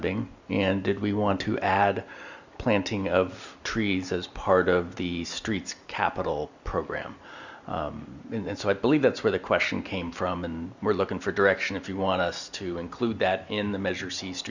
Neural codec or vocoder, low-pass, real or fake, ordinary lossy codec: none; 7.2 kHz; real; Opus, 64 kbps